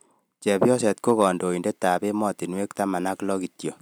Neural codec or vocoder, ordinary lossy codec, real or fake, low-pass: none; none; real; none